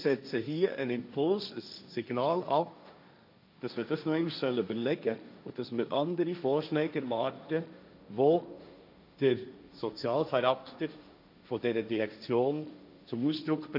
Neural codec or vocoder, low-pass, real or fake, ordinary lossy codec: codec, 16 kHz, 1.1 kbps, Voila-Tokenizer; 5.4 kHz; fake; none